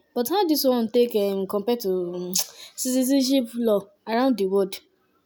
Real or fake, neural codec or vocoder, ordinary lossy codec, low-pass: real; none; none; none